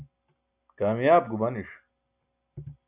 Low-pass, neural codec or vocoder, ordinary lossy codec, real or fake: 3.6 kHz; none; AAC, 32 kbps; real